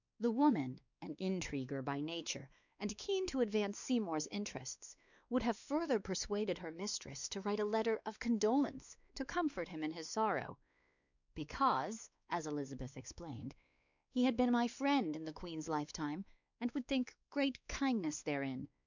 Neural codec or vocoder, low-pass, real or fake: codec, 16 kHz, 2 kbps, X-Codec, WavLM features, trained on Multilingual LibriSpeech; 7.2 kHz; fake